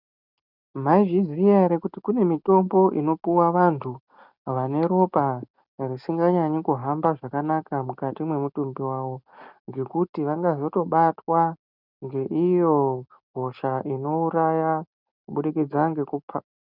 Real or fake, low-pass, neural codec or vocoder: real; 5.4 kHz; none